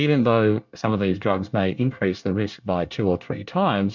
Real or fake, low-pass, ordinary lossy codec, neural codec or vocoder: fake; 7.2 kHz; MP3, 64 kbps; codec, 24 kHz, 1 kbps, SNAC